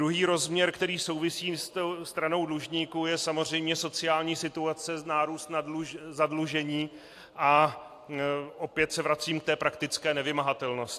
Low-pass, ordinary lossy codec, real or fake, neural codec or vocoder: 14.4 kHz; AAC, 64 kbps; real; none